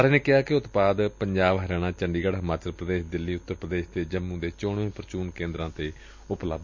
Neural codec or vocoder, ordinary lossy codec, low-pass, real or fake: none; MP3, 48 kbps; 7.2 kHz; real